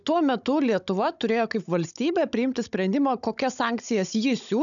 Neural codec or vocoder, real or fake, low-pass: codec, 16 kHz, 16 kbps, FunCodec, trained on Chinese and English, 50 frames a second; fake; 7.2 kHz